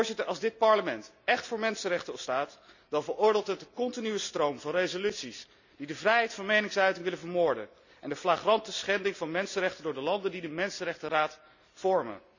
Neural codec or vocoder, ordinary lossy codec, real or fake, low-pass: none; none; real; 7.2 kHz